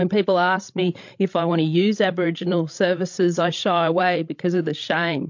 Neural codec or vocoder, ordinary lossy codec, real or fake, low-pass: codec, 16 kHz, 16 kbps, FreqCodec, larger model; MP3, 48 kbps; fake; 7.2 kHz